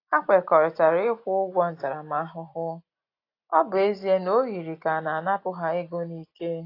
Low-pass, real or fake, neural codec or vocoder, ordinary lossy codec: 5.4 kHz; real; none; AAC, 32 kbps